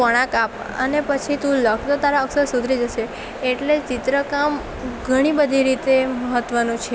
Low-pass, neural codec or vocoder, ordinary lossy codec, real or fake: none; none; none; real